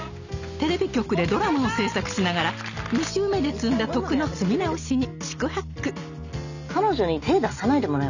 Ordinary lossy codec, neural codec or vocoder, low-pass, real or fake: none; none; 7.2 kHz; real